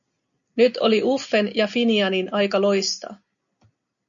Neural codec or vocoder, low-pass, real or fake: none; 7.2 kHz; real